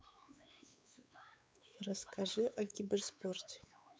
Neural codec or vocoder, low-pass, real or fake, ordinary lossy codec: codec, 16 kHz, 4 kbps, X-Codec, WavLM features, trained on Multilingual LibriSpeech; none; fake; none